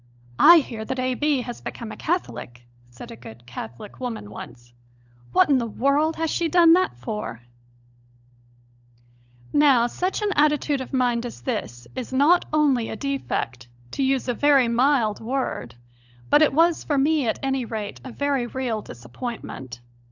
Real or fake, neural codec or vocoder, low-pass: fake; codec, 16 kHz, 16 kbps, FunCodec, trained on LibriTTS, 50 frames a second; 7.2 kHz